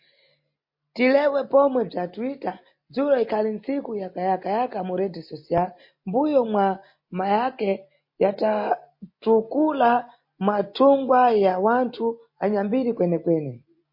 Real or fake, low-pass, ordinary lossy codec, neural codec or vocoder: real; 5.4 kHz; MP3, 32 kbps; none